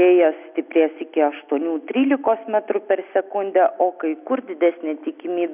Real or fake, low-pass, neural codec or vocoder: real; 3.6 kHz; none